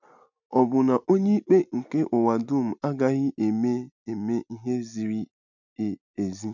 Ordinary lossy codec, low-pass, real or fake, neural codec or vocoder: none; 7.2 kHz; real; none